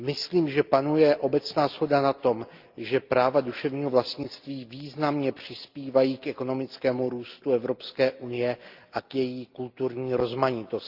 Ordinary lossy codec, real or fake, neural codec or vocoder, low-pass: Opus, 24 kbps; real; none; 5.4 kHz